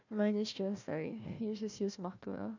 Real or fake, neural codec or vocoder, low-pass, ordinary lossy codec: fake; codec, 16 kHz, 1 kbps, FunCodec, trained on Chinese and English, 50 frames a second; 7.2 kHz; none